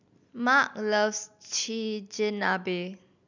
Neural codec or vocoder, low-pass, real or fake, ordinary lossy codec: none; 7.2 kHz; real; none